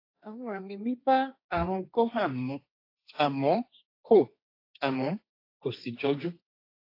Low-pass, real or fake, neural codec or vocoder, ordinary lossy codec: 5.4 kHz; fake; codec, 16 kHz, 1.1 kbps, Voila-Tokenizer; AAC, 32 kbps